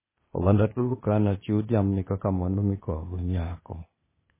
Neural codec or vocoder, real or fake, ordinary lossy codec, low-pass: codec, 16 kHz, 0.8 kbps, ZipCodec; fake; MP3, 16 kbps; 3.6 kHz